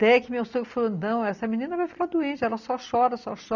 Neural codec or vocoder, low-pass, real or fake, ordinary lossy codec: none; 7.2 kHz; real; none